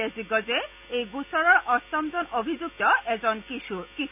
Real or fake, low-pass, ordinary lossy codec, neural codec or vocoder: real; 3.6 kHz; none; none